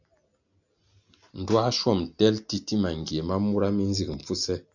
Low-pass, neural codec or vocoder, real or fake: 7.2 kHz; none; real